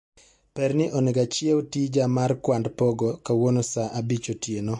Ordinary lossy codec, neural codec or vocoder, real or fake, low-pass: MP3, 48 kbps; none; real; 10.8 kHz